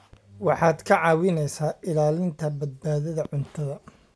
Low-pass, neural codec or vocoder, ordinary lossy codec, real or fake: none; none; none; real